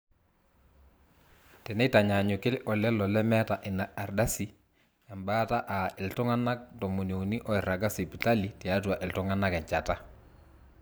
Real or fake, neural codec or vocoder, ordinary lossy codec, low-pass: fake; vocoder, 44.1 kHz, 128 mel bands every 512 samples, BigVGAN v2; none; none